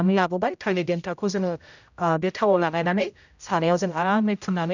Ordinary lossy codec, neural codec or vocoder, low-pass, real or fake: none; codec, 16 kHz, 0.5 kbps, X-Codec, HuBERT features, trained on general audio; 7.2 kHz; fake